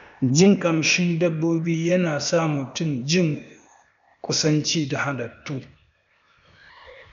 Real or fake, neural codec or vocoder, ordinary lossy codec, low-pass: fake; codec, 16 kHz, 0.8 kbps, ZipCodec; none; 7.2 kHz